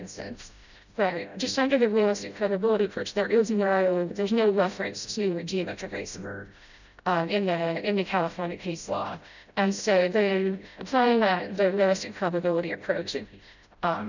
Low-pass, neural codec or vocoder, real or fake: 7.2 kHz; codec, 16 kHz, 0.5 kbps, FreqCodec, smaller model; fake